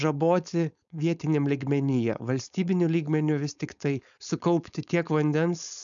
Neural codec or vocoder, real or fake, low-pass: codec, 16 kHz, 4.8 kbps, FACodec; fake; 7.2 kHz